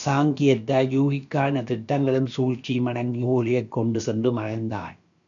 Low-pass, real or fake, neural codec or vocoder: 7.2 kHz; fake; codec, 16 kHz, about 1 kbps, DyCAST, with the encoder's durations